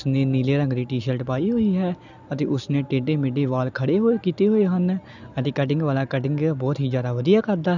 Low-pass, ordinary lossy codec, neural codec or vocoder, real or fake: 7.2 kHz; none; none; real